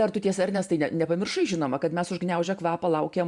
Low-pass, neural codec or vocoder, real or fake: 10.8 kHz; vocoder, 44.1 kHz, 128 mel bands every 512 samples, BigVGAN v2; fake